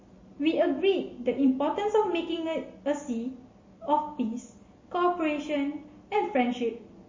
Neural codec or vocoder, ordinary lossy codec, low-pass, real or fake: none; MP3, 32 kbps; 7.2 kHz; real